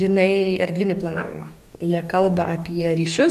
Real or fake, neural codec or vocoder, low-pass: fake; codec, 44.1 kHz, 2.6 kbps, DAC; 14.4 kHz